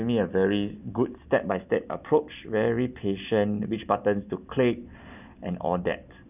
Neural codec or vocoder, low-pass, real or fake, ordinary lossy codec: none; 3.6 kHz; real; none